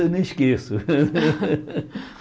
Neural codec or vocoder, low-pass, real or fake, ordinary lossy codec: none; none; real; none